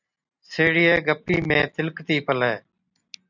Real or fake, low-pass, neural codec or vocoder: real; 7.2 kHz; none